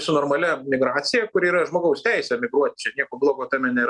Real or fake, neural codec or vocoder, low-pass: real; none; 10.8 kHz